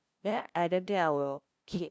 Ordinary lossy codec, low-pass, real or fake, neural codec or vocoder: none; none; fake; codec, 16 kHz, 0.5 kbps, FunCodec, trained on LibriTTS, 25 frames a second